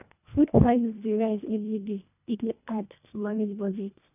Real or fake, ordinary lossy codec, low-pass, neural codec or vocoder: fake; none; 3.6 kHz; codec, 24 kHz, 1.5 kbps, HILCodec